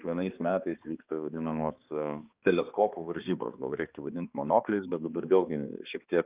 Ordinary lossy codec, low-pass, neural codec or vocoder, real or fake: Opus, 24 kbps; 3.6 kHz; codec, 16 kHz, 2 kbps, X-Codec, HuBERT features, trained on balanced general audio; fake